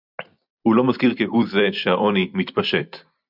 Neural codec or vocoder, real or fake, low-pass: vocoder, 44.1 kHz, 128 mel bands every 256 samples, BigVGAN v2; fake; 5.4 kHz